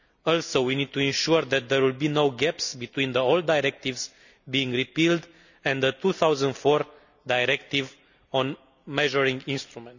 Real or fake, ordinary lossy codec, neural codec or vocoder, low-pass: real; none; none; 7.2 kHz